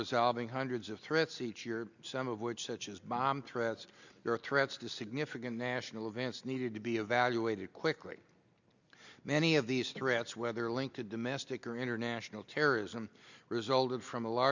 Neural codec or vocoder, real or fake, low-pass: none; real; 7.2 kHz